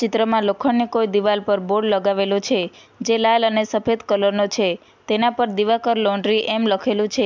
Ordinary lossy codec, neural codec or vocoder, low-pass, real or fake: MP3, 64 kbps; none; 7.2 kHz; real